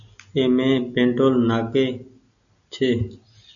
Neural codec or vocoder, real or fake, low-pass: none; real; 7.2 kHz